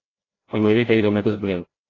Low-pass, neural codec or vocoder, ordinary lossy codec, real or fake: 7.2 kHz; codec, 16 kHz, 0.5 kbps, FreqCodec, larger model; AAC, 32 kbps; fake